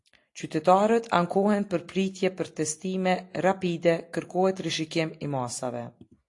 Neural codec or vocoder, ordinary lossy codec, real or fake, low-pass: none; AAC, 48 kbps; real; 10.8 kHz